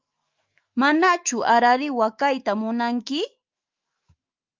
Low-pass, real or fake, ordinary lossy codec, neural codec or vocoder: 7.2 kHz; fake; Opus, 24 kbps; autoencoder, 48 kHz, 128 numbers a frame, DAC-VAE, trained on Japanese speech